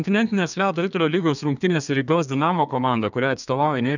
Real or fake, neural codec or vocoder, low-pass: fake; codec, 32 kHz, 1.9 kbps, SNAC; 7.2 kHz